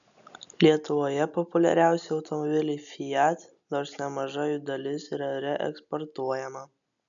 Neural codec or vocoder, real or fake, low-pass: none; real; 7.2 kHz